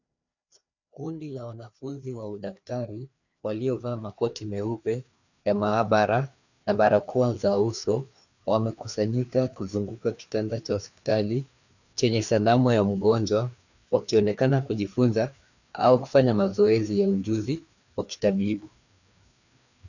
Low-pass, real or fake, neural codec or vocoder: 7.2 kHz; fake; codec, 16 kHz, 2 kbps, FreqCodec, larger model